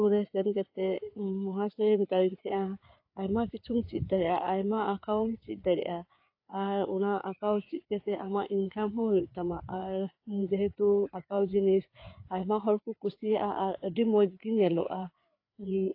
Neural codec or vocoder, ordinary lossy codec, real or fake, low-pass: codec, 16 kHz, 4 kbps, FreqCodec, larger model; AAC, 32 kbps; fake; 5.4 kHz